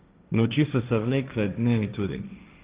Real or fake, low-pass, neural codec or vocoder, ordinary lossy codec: fake; 3.6 kHz; codec, 16 kHz, 1.1 kbps, Voila-Tokenizer; Opus, 32 kbps